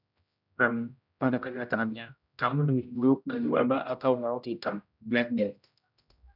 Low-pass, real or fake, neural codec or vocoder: 5.4 kHz; fake; codec, 16 kHz, 0.5 kbps, X-Codec, HuBERT features, trained on general audio